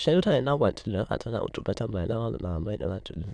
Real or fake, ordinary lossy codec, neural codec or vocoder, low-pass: fake; none; autoencoder, 22.05 kHz, a latent of 192 numbers a frame, VITS, trained on many speakers; 9.9 kHz